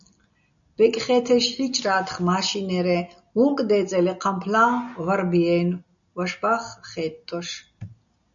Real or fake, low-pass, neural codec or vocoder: real; 7.2 kHz; none